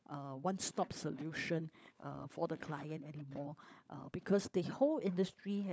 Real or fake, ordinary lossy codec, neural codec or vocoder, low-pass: fake; none; codec, 16 kHz, 16 kbps, FunCodec, trained on LibriTTS, 50 frames a second; none